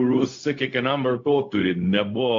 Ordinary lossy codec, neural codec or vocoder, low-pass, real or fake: AAC, 48 kbps; codec, 16 kHz, 0.4 kbps, LongCat-Audio-Codec; 7.2 kHz; fake